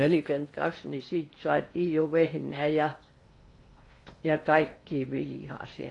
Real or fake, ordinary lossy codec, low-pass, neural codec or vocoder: fake; AAC, 48 kbps; 10.8 kHz; codec, 16 kHz in and 24 kHz out, 0.8 kbps, FocalCodec, streaming, 65536 codes